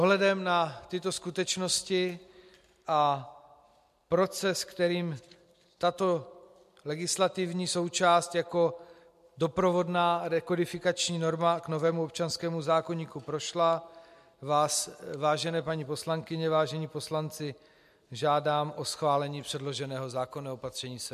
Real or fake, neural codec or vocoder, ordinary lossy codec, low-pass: real; none; MP3, 64 kbps; 14.4 kHz